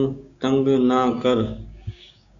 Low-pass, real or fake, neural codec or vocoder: 7.2 kHz; fake; codec, 16 kHz, 6 kbps, DAC